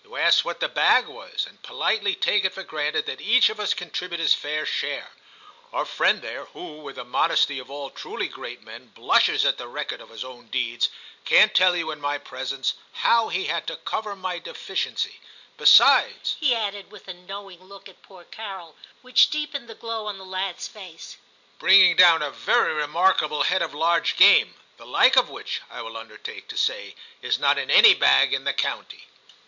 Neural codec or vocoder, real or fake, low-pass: none; real; 7.2 kHz